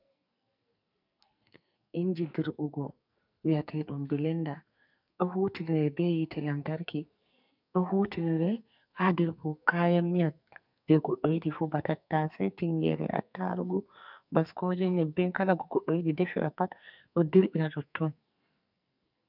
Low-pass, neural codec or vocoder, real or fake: 5.4 kHz; codec, 44.1 kHz, 2.6 kbps, SNAC; fake